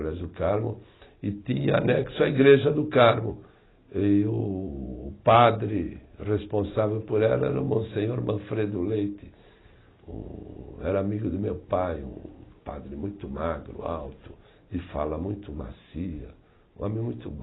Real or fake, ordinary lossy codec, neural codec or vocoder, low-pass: real; AAC, 16 kbps; none; 7.2 kHz